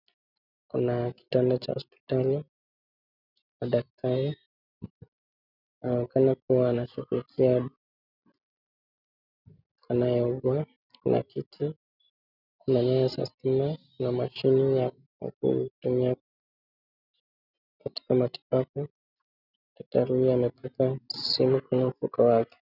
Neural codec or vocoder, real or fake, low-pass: none; real; 5.4 kHz